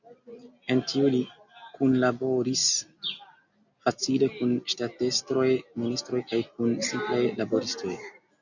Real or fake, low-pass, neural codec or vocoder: real; 7.2 kHz; none